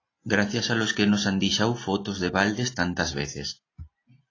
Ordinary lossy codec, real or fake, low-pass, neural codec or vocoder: AAC, 32 kbps; real; 7.2 kHz; none